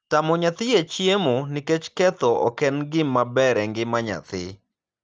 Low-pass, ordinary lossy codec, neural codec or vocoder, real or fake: 7.2 kHz; Opus, 24 kbps; none; real